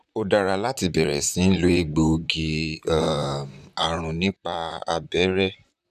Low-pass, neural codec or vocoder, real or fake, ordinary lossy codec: 14.4 kHz; vocoder, 44.1 kHz, 128 mel bands, Pupu-Vocoder; fake; none